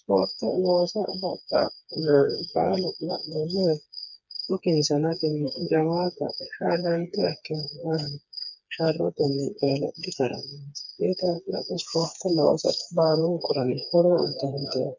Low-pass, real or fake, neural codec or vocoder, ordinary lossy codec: 7.2 kHz; fake; codec, 16 kHz, 4 kbps, FreqCodec, smaller model; MP3, 64 kbps